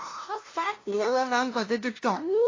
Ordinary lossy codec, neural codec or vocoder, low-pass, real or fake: AAC, 32 kbps; codec, 16 kHz, 0.5 kbps, FunCodec, trained on LibriTTS, 25 frames a second; 7.2 kHz; fake